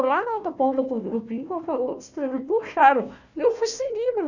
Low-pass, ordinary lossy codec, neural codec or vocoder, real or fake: 7.2 kHz; none; codec, 16 kHz, 1 kbps, FunCodec, trained on Chinese and English, 50 frames a second; fake